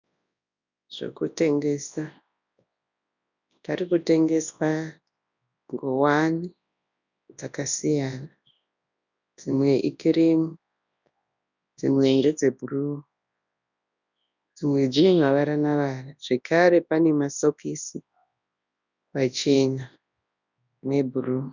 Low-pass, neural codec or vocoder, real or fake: 7.2 kHz; codec, 24 kHz, 0.9 kbps, WavTokenizer, large speech release; fake